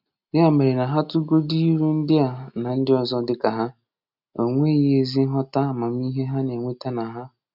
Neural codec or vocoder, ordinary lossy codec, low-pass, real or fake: none; none; 5.4 kHz; real